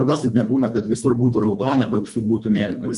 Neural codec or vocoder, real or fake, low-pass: codec, 24 kHz, 1.5 kbps, HILCodec; fake; 10.8 kHz